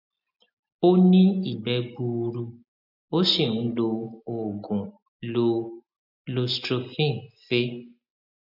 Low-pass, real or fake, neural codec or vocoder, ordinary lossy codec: 5.4 kHz; real; none; MP3, 48 kbps